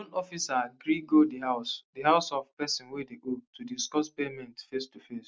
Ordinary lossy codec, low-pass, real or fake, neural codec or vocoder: none; none; real; none